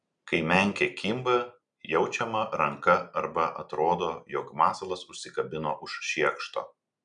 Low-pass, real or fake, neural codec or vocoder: 9.9 kHz; real; none